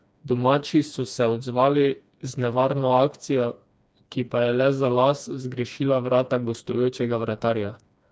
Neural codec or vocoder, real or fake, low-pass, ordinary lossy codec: codec, 16 kHz, 2 kbps, FreqCodec, smaller model; fake; none; none